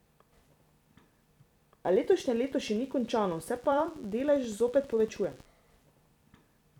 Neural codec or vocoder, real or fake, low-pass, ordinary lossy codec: vocoder, 44.1 kHz, 128 mel bands every 256 samples, BigVGAN v2; fake; 19.8 kHz; none